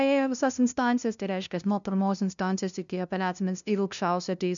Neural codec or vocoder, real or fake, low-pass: codec, 16 kHz, 0.5 kbps, FunCodec, trained on LibriTTS, 25 frames a second; fake; 7.2 kHz